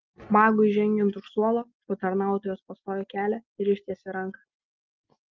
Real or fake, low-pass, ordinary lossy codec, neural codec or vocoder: real; 7.2 kHz; Opus, 32 kbps; none